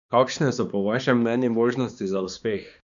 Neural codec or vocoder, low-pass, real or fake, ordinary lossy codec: codec, 16 kHz, 2 kbps, X-Codec, HuBERT features, trained on balanced general audio; 7.2 kHz; fake; none